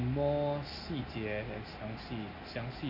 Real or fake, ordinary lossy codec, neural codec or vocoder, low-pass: real; none; none; 5.4 kHz